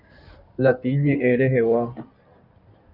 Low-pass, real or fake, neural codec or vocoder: 5.4 kHz; fake; codec, 16 kHz in and 24 kHz out, 2.2 kbps, FireRedTTS-2 codec